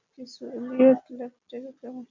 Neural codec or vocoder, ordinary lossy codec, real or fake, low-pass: none; MP3, 64 kbps; real; 7.2 kHz